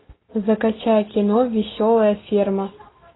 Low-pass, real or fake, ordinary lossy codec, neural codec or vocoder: 7.2 kHz; real; AAC, 16 kbps; none